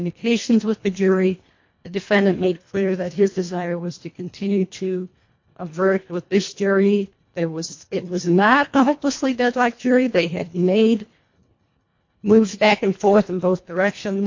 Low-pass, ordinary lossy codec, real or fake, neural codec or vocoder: 7.2 kHz; MP3, 48 kbps; fake; codec, 24 kHz, 1.5 kbps, HILCodec